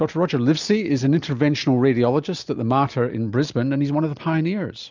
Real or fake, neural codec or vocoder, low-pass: real; none; 7.2 kHz